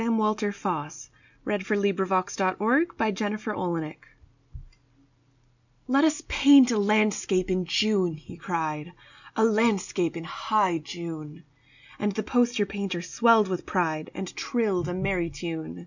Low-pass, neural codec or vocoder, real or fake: 7.2 kHz; none; real